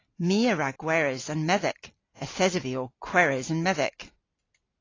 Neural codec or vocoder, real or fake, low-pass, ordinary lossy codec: none; real; 7.2 kHz; AAC, 32 kbps